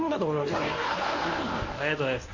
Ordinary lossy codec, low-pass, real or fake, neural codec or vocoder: MP3, 32 kbps; 7.2 kHz; fake; codec, 24 kHz, 0.9 kbps, WavTokenizer, medium speech release version 1